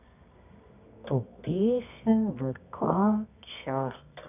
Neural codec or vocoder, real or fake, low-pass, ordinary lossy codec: codec, 24 kHz, 0.9 kbps, WavTokenizer, medium music audio release; fake; 3.6 kHz; none